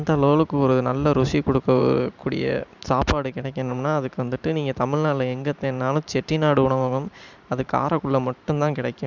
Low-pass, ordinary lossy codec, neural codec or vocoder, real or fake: 7.2 kHz; none; vocoder, 44.1 kHz, 128 mel bands every 512 samples, BigVGAN v2; fake